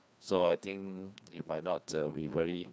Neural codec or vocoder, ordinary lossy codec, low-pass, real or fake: codec, 16 kHz, 2 kbps, FreqCodec, larger model; none; none; fake